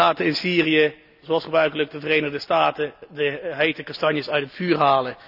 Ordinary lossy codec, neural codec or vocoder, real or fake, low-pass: none; none; real; 5.4 kHz